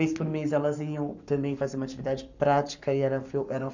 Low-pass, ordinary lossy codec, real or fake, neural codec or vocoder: 7.2 kHz; none; fake; codec, 44.1 kHz, 7.8 kbps, Pupu-Codec